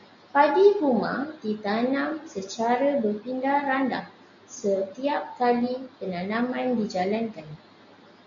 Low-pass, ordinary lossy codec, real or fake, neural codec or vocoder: 7.2 kHz; MP3, 64 kbps; real; none